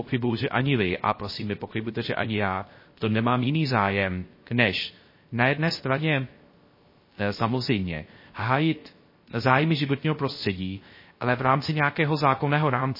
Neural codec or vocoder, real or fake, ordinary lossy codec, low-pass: codec, 16 kHz, 0.3 kbps, FocalCodec; fake; MP3, 24 kbps; 5.4 kHz